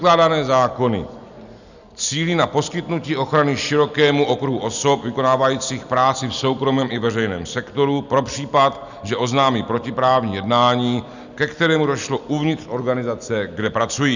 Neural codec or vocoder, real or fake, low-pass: none; real; 7.2 kHz